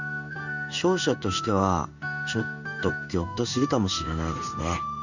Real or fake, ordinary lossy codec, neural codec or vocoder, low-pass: fake; none; codec, 16 kHz in and 24 kHz out, 1 kbps, XY-Tokenizer; 7.2 kHz